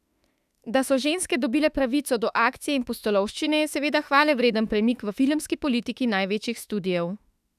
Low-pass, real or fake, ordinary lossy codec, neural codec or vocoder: 14.4 kHz; fake; none; autoencoder, 48 kHz, 32 numbers a frame, DAC-VAE, trained on Japanese speech